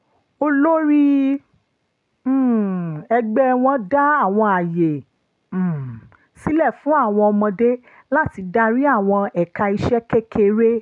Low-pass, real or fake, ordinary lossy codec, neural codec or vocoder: 10.8 kHz; real; none; none